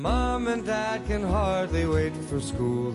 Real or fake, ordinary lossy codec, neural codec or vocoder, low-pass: real; MP3, 48 kbps; none; 14.4 kHz